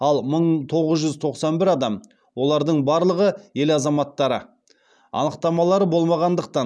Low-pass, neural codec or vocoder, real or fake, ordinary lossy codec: none; none; real; none